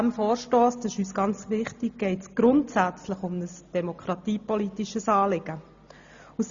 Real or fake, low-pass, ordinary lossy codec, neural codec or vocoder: real; 7.2 kHz; Opus, 64 kbps; none